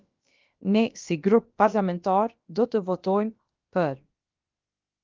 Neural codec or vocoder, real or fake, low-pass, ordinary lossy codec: codec, 16 kHz, about 1 kbps, DyCAST, with the encoder's durations; fake; 7.2 kHz; Opus, 32 kbps